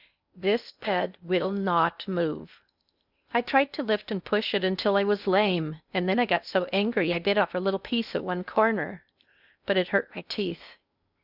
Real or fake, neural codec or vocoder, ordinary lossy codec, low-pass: fake; codec, 16 kHz in and 24 kHz out, 0.6 kbps, FocalCodec, streaming, 2048 codes; Opus, 64 kbps; 5.4 kHz